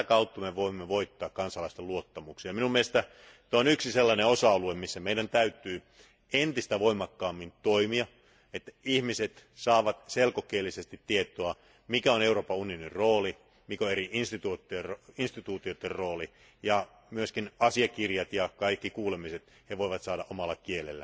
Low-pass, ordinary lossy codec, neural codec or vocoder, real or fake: none; none; none; real